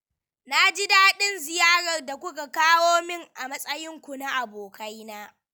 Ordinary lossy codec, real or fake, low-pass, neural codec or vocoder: none; real; none; none